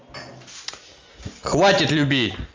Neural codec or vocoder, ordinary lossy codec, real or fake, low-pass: none; Opus, 32 kbps; real; 7.2 kHz